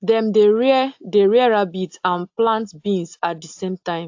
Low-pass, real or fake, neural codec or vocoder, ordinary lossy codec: 7.2 kHz; real; none; AAC, 48 kbps